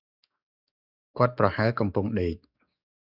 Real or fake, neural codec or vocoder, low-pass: fake; codec, 44.1 kHz, 7.8 kbps, DAC; 5.4 kHz